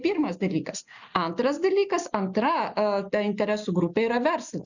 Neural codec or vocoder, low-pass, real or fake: none; 7.2 kHz; real